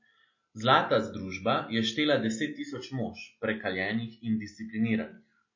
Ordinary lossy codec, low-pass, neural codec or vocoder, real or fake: MP3, 32 kbps; 7.2 kHz; none; real